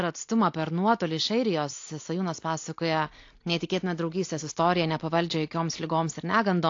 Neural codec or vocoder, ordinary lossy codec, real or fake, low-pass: none; AAC, 48 kbps; real; 7.2 kHz